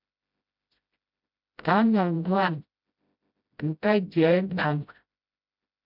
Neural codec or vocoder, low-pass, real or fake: codec, 16 kHz, 0.5 kbps, FreqCodec, smaller model; 5.4 kHz; fake